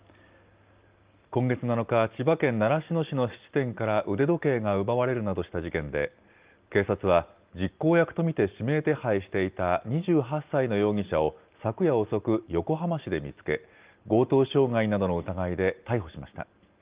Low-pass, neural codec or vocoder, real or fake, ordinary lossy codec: 3.6 kHz; none; real; Opus, 32 kbps